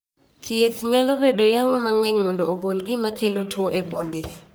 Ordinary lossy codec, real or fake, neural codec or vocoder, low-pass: none; fake; codec, 44.1 kHz, 1.7 kbps, Pupu-Codec; none